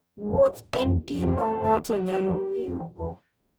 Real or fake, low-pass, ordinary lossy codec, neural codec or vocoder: fake; none; none; codec, 44.1 kHz, 0.9 kbps, DAC